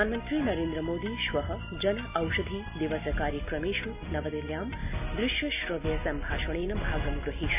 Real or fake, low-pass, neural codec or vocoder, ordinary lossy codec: real; 3.6 kHz; none; MP3, 32 kbps